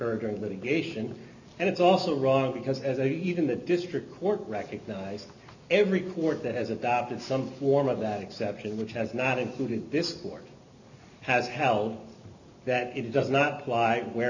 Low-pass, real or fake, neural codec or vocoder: 7.2 kHz; real; none